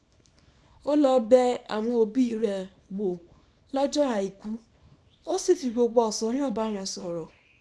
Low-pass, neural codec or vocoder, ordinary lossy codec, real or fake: none; codec, 24 kHz, 0.9 kbps, WavTokenizer, small release; none; fake